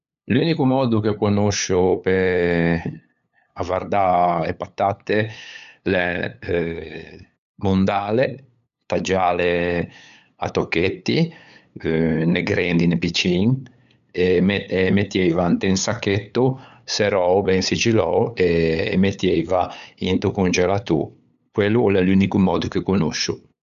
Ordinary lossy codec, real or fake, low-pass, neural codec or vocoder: none; fake; 7.2 kHz; codec, 16 kHz, 8 kbps, FunCodec, trained on LibriTTS, 25 frames a second